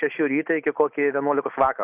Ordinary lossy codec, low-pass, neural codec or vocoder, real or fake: AAC, 32 kbps; 3.6 kHz; none; real